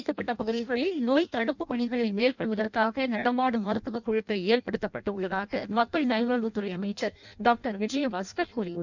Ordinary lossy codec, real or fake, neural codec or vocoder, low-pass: none; fake; codec, 16 kHz in and 24 kHz out, 0.6 kbps, FireRedTTS-2 codec; 7.2 kHz